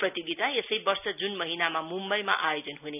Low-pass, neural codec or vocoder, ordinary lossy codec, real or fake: 3.6 kHz; none; none; real